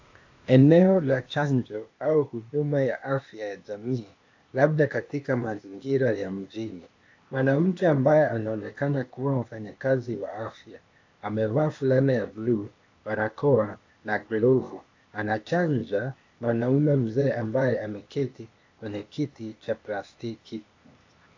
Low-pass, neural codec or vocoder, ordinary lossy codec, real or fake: 7.2 kHz; codec, 16 kHz, 0.8 kbps, ZipCodec; AAC, 48 kbps; fake